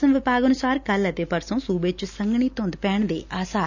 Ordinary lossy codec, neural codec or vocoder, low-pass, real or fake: none; none; 7.2 kHz; real